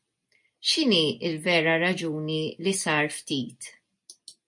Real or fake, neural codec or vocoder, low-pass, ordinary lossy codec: real; none; 10.8 kHz; MP3, 64 kbps